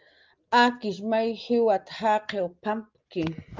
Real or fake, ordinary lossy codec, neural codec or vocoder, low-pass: real; Opus, 24 kbps; none; 7.2 kHz